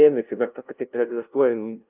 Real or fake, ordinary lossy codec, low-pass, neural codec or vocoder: fake; Opus, 24 kbps; 3.6 kHz; codec, 16 kHz, 0.5 kbps, FunCodec, trained on LibriTTS, 25 frames a second